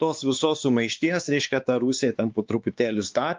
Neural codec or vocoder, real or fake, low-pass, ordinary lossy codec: codec, 16 kHz, 2 kbps, X-Codec, WavLM features, trained on Multilingual LibriSpeech; fake; 7.2 kHz; Opus, 24 kbps